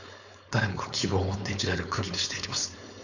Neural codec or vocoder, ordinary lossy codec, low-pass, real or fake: codec, 16 kHz, 4.8 kbps, FACodec; none; 7.2 kHz; fake